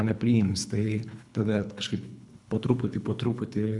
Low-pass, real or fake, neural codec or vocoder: 10.8 kHz; fake; codec, 24 kHz, 3 kbps, HILCodec